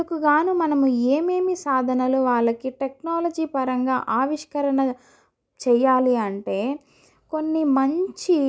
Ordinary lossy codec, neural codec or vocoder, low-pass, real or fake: none; none; none; real